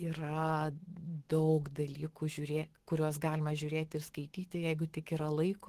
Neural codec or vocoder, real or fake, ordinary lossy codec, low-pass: autoencoder, 48 kHz, 128 numbers a frame, DAC-VAE, trained on Japanese speech; fake; Opus, 16 kbps; 14.4 kHz